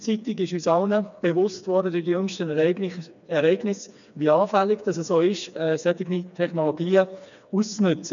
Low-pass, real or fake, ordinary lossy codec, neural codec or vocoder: 7.2 kHz; fake; none; codec, 16 kHz, 2 kbps, FreqCodec, smaller model